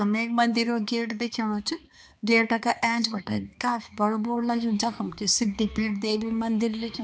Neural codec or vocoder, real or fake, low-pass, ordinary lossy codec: codec, 16 kHz, 2 kbps, X-Codec, HuBERT features, trained on balanced general audio; fake; none; none